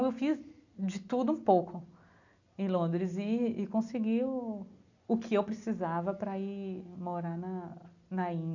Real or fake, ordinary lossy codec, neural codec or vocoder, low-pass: real; none; none; 7.2 kHz